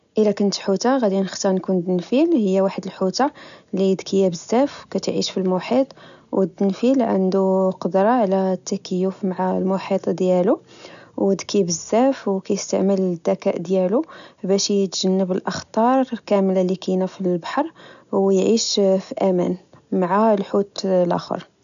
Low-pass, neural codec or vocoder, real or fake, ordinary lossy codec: 7.2 kHz; none; real; none